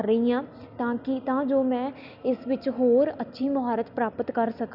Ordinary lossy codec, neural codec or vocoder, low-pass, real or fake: none; none; 5.4 kHz; real